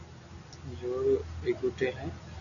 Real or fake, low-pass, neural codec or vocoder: real; 7.2 kHz; none